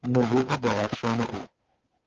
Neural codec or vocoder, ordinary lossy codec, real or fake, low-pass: codec, 16 kHz, 8 kbps, FunCodec, trained on Chinese and English, 25 frames a second; Opus, 24 kbps; fake; 7.2 kHz